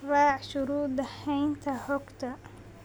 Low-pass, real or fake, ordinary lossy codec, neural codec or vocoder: none; real; none; none